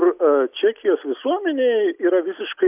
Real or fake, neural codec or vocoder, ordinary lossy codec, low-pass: real; none; AAC, 32 kbps; 3.6 kHz